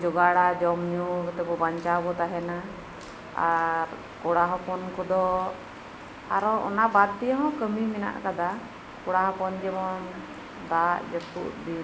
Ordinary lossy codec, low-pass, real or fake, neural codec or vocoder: none; none; real; none